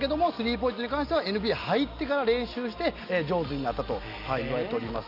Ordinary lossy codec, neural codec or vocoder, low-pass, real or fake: none; none; 5.4 kHz; real